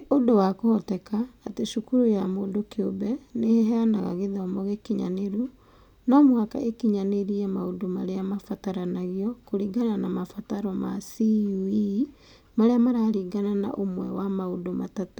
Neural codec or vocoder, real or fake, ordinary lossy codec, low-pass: none; real; none; 19.8 kHz